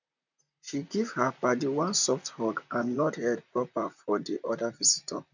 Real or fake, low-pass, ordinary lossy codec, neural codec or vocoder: fake; 7.2 kHz; none; vocoder, 44.1 kHz, 128 mel bands, Pupu-Vocoder